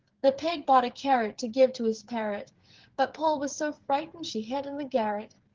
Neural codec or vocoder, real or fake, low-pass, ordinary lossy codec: codec, 16 kHz, 8 kbps, FreqCodec, smaller model; fake; 7.2 kHz; Opus, 16 kbps